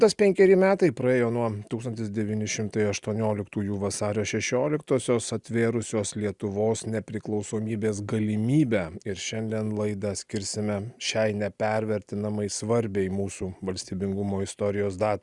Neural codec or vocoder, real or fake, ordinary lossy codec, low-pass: none; real; Opus, 64 kbps; 10.8 kHz